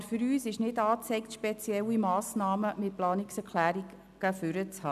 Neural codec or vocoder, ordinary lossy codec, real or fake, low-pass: none; none; real; 14.4 kHz